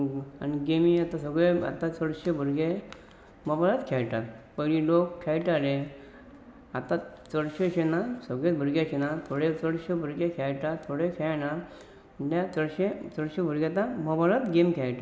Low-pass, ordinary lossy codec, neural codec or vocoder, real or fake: none; none; none; real